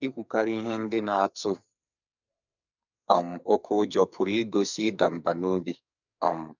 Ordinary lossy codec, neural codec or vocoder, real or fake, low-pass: none; codec, 44.1 kHz, 2.6 kbps, SNAC; fake; 7.2 kHz